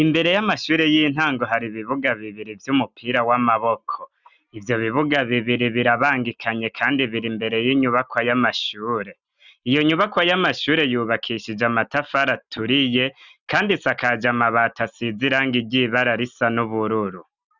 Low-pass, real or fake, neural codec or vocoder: 7.2 kHz; real; none